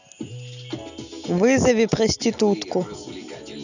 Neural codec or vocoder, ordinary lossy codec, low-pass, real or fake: none; none; 7.2 kHz; real